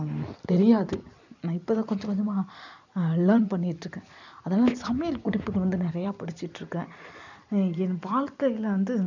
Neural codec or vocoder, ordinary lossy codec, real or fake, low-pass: vocoder, 44.1 kHz, 128 mel bands every 256 samples, BigVGAN v2; none; fake; 7.2 kHz